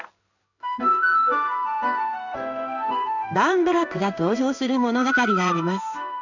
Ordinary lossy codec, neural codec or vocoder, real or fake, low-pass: none; codec, 16 kHz in and 24 kHz out, 1 kbps, XY-Tokenizer; fake; 7.2 kHz